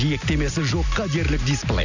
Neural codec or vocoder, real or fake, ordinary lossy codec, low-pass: none; real; none; 7.2 kHz